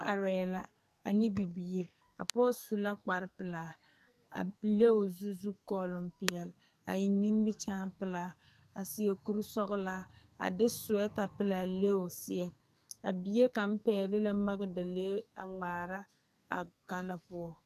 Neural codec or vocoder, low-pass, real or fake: codec, 44.1 kHz, 2.6 kbps, SNAC; 14.4 kHz; fake